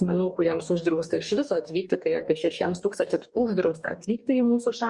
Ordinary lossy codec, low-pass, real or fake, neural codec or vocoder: Opus, 64 kbps; 10.8 kHz; fake; codec, 44.1 kHz, 2.6 kbps, DAC